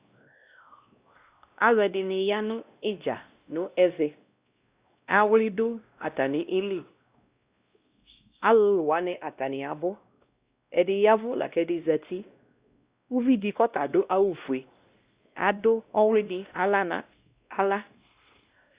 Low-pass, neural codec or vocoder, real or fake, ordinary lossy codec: 3.6 kHz; codec, 16 kHz, 1 kbps, X-Codec, WavLM features, trained on Multilingual LibriSpeech; fake; Opus, 64 kbps